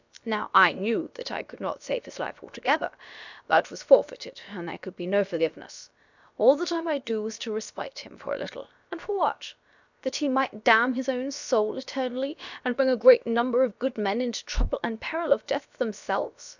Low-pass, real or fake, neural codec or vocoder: 7.2 kHz; fake; codec, 16 kHz, about 1 kbps, DyCAST, with the encoder's durations